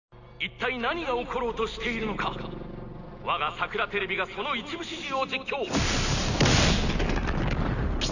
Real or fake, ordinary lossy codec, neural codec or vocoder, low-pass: real; none; none; 7.2 kHz